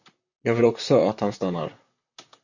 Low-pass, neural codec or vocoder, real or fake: 7.2 kHz; vocoder, 44.1 kHz, 128 mel bands, Pupu-Vocoder; fake